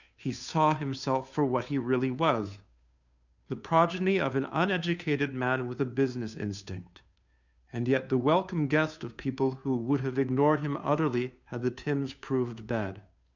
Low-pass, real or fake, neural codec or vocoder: 7.2 kHz; fake; codec, 16 kHz, 2 kbps, FunCodec, trained on Chinese and English, 25 frames a second